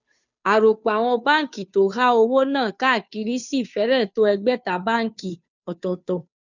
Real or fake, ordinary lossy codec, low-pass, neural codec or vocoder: fake; none; 7.2 kHz; codec, 16 kHz, 2 kbps, FunCodec, trained on Chinese and English, 25 frames a second